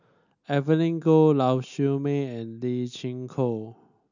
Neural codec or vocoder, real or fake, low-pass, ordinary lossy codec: none; real; 7.2 kHz; none